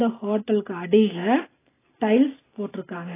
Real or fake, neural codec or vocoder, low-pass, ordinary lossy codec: real; none; 3.6 kHz; AAC, 16 kbps